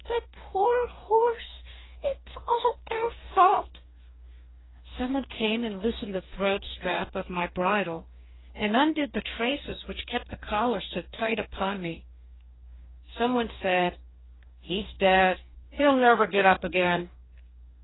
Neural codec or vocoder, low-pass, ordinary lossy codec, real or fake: codec, 44.1 kHz, 2.6 kbps, DAC; 7.2 kHz; AAC, 16 kbps; fake